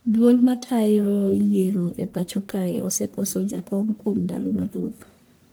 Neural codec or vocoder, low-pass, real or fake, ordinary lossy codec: codec, 44.1 kHz, 1.7 kbps, Pupu-Codec; none; fake; none